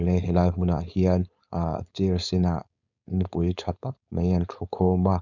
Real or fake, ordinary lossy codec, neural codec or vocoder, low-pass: fake; none; codec, 16 kHz, 4.8 kbps, FACodec; 7.2 kHz